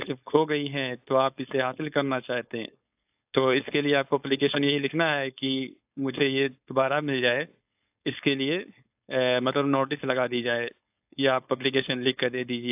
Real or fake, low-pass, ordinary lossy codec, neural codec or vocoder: fake; 3.6 kHz; AAC, 32 kbps; codec, 16 kHz, 4.8 kbps, FACodec